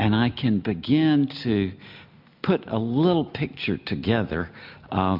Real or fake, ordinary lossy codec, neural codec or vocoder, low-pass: real; MP3, 48 kbps; none; 5.4 kHz